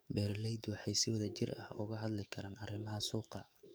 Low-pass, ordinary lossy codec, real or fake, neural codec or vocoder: none; none; fake; codec, 44.1 kHz, 7.8 kbps, DAC